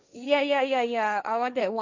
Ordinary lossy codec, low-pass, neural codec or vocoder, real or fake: none; 7.2 kHz; codec, 16 kHz, 1.1 kbps, Voila-Tokenizer; fake